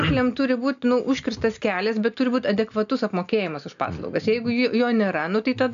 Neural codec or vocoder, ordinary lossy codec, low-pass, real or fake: none; AAC, 96 kbps; 7.2 kHz; real